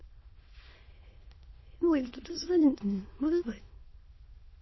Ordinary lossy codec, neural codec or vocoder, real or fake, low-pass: MP3, 24 kbps; autoencoder, 22.05 kHz, a latent of 192 numbers a frame, VITS, trained on many speakers; fake; 7.2 kHz